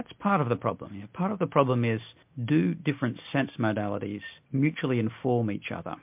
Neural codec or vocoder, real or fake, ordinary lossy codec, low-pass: none; real; MP3, 32 kbps; 3.6 kHz